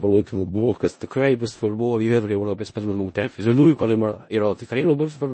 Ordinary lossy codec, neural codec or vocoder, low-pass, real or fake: MP3, 32 kbps; codec, 16 kHz in and 24 kHz out, 0.4 kbps, LongCat-Audio-Codec, four codebook decoder; 9.9 kHz; fake